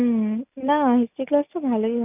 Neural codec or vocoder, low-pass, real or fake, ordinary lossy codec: none; 3.6 kHz; real; none